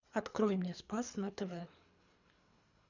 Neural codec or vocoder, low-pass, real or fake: codec, 24 kHz, 3 kbps, HILCodec; 7.2 kHz; fake